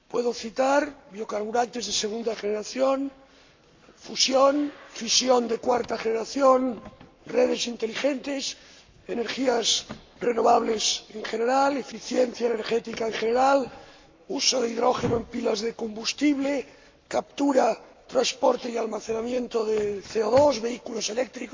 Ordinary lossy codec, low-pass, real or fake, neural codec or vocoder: MP3, 64 kbps; 7.2 kHz; fake; codec, 44.1 kHz, 7.8 kbps, Pupu-Codec